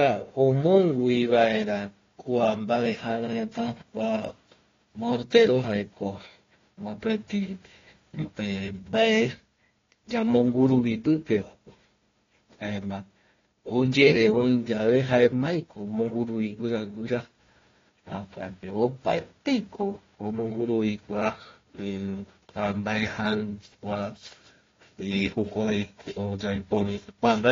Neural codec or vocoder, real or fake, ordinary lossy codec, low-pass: codec, 16 kHz, 1 kbps, FunCodec, trained on Chinese and English, 50 frames a second; fake; AAC, 32 kbps; 7.2 kHz